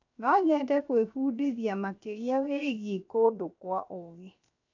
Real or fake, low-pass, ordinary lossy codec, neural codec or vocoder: fake; 7.2 kHz; none; codec, 16 kHz, 0.7 kbps, FocalCodec